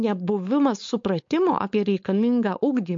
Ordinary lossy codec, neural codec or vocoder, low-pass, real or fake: MP3, 48 kbps; codec, 16 kHz, 4.8 kbps, FACodec; 7.2 kHz; fake